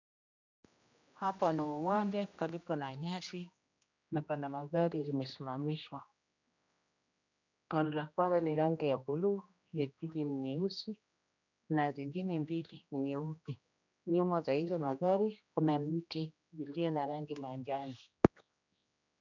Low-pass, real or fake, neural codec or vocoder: 7.2 kHz; fake; codec, 16 kHz, 1 kbps, X-Codec, HuBERT features, trained on general audio